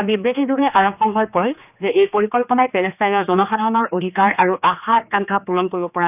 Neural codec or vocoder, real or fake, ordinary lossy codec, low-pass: codec, 16 kHz, 2 kbps, X-Codec, HuBERT features, trained on general audio; fake; none; 3.6 kHz